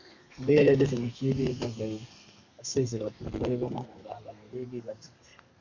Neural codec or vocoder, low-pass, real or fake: codec, 32 kHz, 1.9 kbps, SNAC; 7.2 kHz; fake